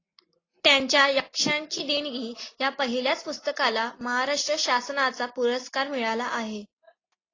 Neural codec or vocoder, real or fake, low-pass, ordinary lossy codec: none; real; 7.2 kHz; AAC, 32 kbps